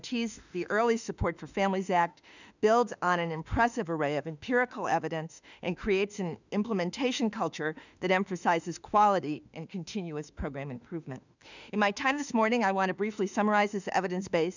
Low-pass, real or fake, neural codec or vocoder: 7.2 kHz; fake; autoencoder, 48 kHz, 32 numbers a frame, DAC-VAE, trained on Japanese speech